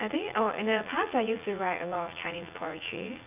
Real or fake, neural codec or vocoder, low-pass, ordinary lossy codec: fake; vocoder, 44.1 kHz, 80 mel bands, Vocos; 3.6 kHz; none